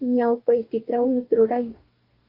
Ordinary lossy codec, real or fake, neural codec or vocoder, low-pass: Opus, 32 kbps; fake; codec, 44.1 kHz, 2.6 kbps, DAC; 5.4 kHz